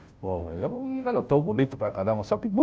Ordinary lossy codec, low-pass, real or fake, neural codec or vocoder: none; none; fake; codec, 16 kHz, 0.5 kbps, FunCodec, trained on Chinese and English, 25 frames a second